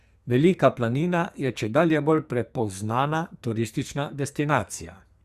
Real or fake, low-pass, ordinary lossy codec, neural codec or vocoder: fake; 14.4 kHz; Opus, 64 kbps; codec, 44.1 kHz, 2.6 kbps, SNAC